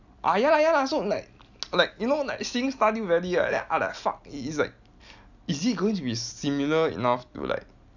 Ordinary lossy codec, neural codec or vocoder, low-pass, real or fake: none; none; 7.2 kHz; real